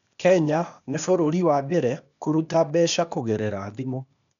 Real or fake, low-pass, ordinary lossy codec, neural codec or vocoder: fake; 7.2 kHz; none; codec, 16 kHz, 0.8 kbps, ZipCodec